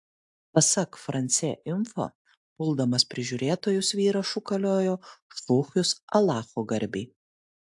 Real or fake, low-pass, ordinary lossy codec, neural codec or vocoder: real; 10.8 kHz; AAC, 64 kbps; none